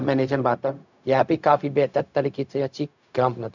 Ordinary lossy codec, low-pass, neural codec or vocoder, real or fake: none; 7.2 kHz; codec, 16 kHz, 0.4 kbps, LongCat-Audio-Codec; fake